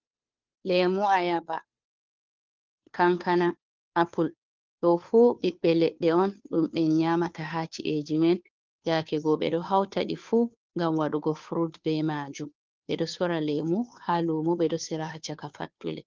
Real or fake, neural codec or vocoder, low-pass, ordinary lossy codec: fake; codec, 16 kHz, 2 kbps, FunCodec, trained on Chinese and English, 25 frames a second; 7.2 kHz; Opus, 32 kbps